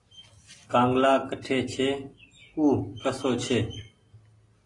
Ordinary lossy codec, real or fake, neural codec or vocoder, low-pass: AAC, 32 kbps; real; none; 10.8 kHz